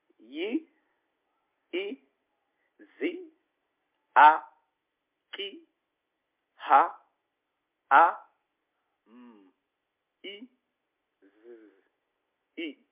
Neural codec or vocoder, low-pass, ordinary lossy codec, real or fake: none; 3.6 kHz; MP3, 32 kbps; real